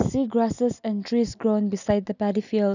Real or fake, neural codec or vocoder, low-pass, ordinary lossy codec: real; none; 7.2 kHz; none